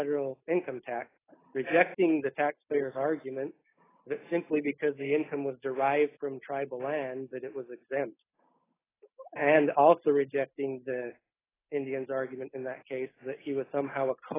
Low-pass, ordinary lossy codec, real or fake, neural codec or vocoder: 3.6 kHz; AAC, 16 kbps; real; none